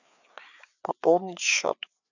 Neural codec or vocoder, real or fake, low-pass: codec, 16 kHz, 4 kbps, FreqCodec, larger model; fake; 7.2 kHz